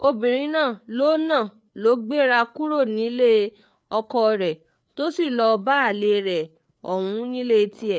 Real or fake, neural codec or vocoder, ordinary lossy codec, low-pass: fake; codec, 16 kHz, 4 kbps, FreqCodec, larger model; none; none